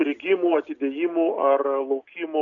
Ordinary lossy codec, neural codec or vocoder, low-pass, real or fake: MP3, 96 kbps; none; 7.2 kHz; real